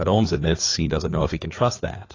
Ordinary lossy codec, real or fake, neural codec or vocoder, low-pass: AAC, 32 kbps; fake; codec, 16 kHz, 4 kbps, FreqCodec, larger model; 7.2 kHz